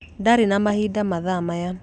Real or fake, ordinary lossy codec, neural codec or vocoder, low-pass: real; none; none; 9.9 kHz